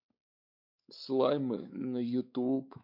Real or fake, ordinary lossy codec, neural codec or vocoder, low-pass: fake; none; codec, 16 kHz, 4 kbps, X-Codec, WavLM features, trained on Multilingual LibriSpeech; 5.4 kHz